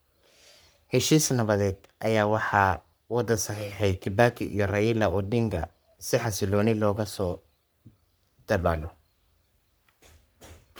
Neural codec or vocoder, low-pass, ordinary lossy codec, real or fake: codec, 44.1 kHz, 3.4 kbps, Pupu-Codec; none; none; fake